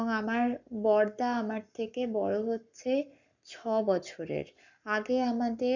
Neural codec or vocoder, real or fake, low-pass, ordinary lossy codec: codec, 44.1 kHz, 7.8 kbps, Pupu-Codec; fake; 7.2 kHz; none